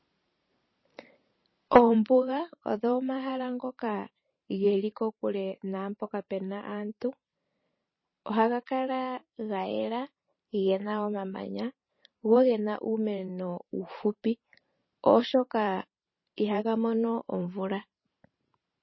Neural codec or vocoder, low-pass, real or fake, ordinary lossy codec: vocoder, 44.1 kHz, 128 mel bands every 512 samples, BigVGAN v2; 7.2 kHz; fake; MP3, 24 kbps